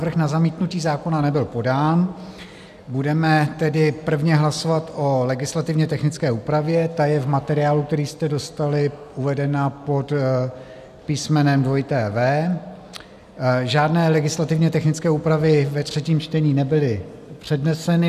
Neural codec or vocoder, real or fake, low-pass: none; real; 14.4 kHz